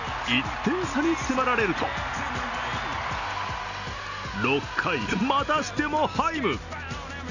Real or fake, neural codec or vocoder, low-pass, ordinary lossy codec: real; none; 7.2 kHz; none